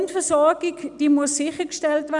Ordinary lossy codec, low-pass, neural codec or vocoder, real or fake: none; 10.8 kHz; none; real